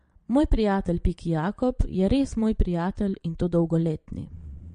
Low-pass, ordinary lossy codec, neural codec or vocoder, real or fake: 9.9 kHz; MP3, 48 kbps; vocoder, 22.05 kHz, 80 mel bands, Vocos; fake